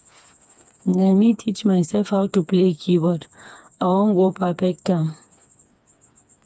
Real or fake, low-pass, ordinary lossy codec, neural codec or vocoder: fake; none; none; codec, 16 kHz, 4 kbps, FreqCodec, smaller model